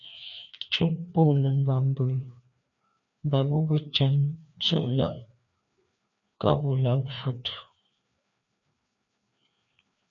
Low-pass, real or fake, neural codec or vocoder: 7.2 kHz; fake; codec, 16 kHz, 2 kbps, FreqCodec, larger model